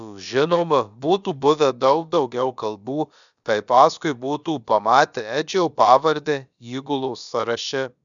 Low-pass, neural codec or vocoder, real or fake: 7.2 kHz; codec, 16 kHz, about 1 kbps, DyCAST, with the encoder's durations; fake